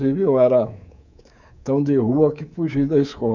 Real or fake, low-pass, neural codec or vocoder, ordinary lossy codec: fake; 7.2 kHz; codec, 24 kHz, 3.1 kbps, DualCodec; none